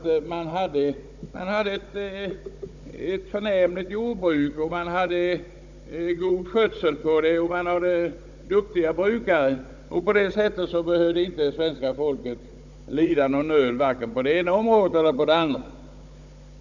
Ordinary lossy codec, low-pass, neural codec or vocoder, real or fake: none; 7.2 kHz; codec, 16 kHz, 16 kbps, FunCodec, trained on Chinese and English, 50 frames a second; fake